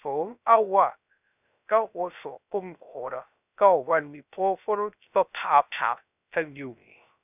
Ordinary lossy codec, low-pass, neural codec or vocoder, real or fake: none; 3.6 kHz; codec, 16 kHz, 0.3 kbps, FocalCodec; fake